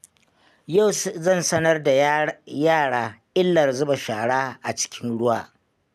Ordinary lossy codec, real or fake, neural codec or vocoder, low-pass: none; real; none; 14.4 kHz